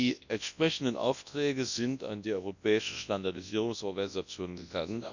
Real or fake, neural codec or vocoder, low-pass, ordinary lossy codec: fake; codec, 24 kHz, 0.9 kbps, WavTokenizer, large speech release; 7.2 kHz; none